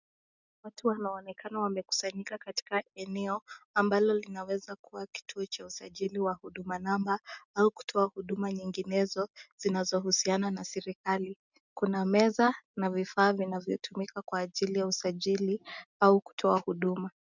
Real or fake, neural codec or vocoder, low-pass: real; none; 7.2 kHz